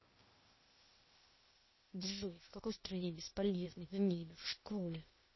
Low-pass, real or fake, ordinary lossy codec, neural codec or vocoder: 7.2 kHz; fake; MP3, 24 kbps; codec, 16 kHz, 0.8 kbps, ZipCodec